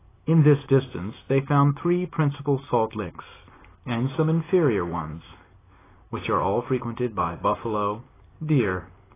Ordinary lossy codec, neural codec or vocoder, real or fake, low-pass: AAC, 16 kbps; none; real; 3.6 kHz